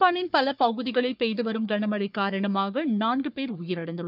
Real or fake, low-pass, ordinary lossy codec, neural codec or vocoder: fake; 5.4 kHz; none; codec, 44.1 kHz, 3.4 kbps, Pupu-Codec